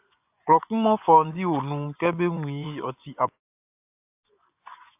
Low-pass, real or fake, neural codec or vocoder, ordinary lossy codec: 3.6 kHz; fake; vocoder, 24 kHz, 100 mel bands, Vocos; AAC, 32 kbps